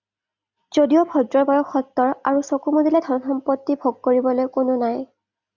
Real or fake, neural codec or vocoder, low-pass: real; none; 7.2 kHz